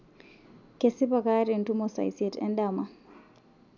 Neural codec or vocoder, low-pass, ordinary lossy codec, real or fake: none; 7.2 kHz; none; real